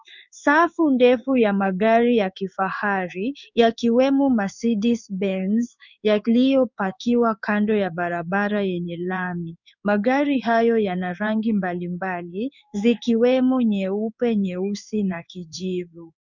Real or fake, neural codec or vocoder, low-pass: fake; codec, 16 kHz in and 24 kHz out, 1 kbps, XY-Tokenizer; 7.2 kHz